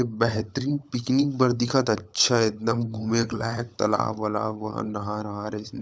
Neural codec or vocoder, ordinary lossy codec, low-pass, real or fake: codec, 16 kHz, 16 kbps, FunCodec, trained on LibriTTS, 50 frames a second; none; none; fake